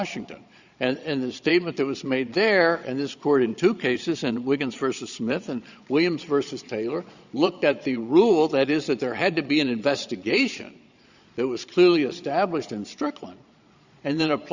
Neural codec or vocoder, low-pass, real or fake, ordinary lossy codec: vocoder, 22.05 kHz, 80 mel bands, Vocos; 7.2 kHz; fake; Opus, 64 kbps